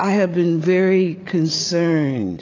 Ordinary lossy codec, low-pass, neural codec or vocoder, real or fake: AAC, 32 kbps; 7.2 kHz; codec, 16 kHz, 8 kbps, FreqCodec, larger model; fake